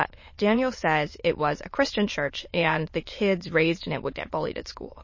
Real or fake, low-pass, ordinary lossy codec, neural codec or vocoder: fake; 7.2 kHz; MP3, 32 kbps; autoencoder, 22.05 kHz, a latent of 192 numbers a frame, VITS, trained on many speakers